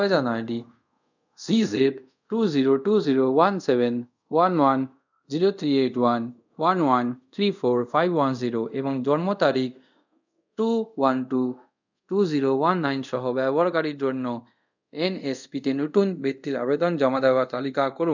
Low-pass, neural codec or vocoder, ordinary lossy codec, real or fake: 7.2 kHz; codec, 24 kHz, 0.5 kbps, DualCodec; none; fake